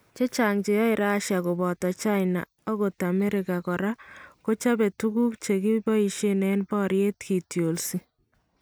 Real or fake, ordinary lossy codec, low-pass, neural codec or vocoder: real; none; none; none